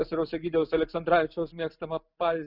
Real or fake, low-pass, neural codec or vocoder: real; 5.4 kHz; none